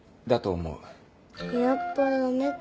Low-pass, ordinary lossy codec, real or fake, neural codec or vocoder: none; none; real; none